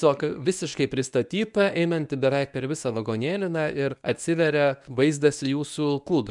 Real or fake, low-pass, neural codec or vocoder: fake; 10.8 kHz; codec, 24 kHz, 0.9 kbps, WavTokenizer, medium speech release version 1